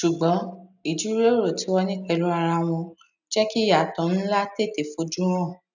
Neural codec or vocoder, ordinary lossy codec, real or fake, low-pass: none; none; real; 7.2 kHz